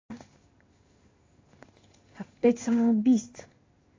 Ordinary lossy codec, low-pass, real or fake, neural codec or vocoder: AAC, 32 kbps; 7.2 kHz; fake; codec, 16 kHz in and 24 kHz out, 1 kbps, XY-Tokenizer